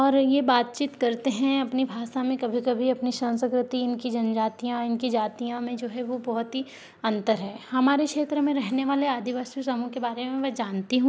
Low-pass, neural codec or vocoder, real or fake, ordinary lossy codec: none; none; real; none